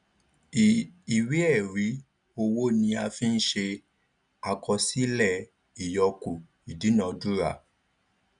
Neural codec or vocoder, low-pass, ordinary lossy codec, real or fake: none; 9.9 kHz; none; real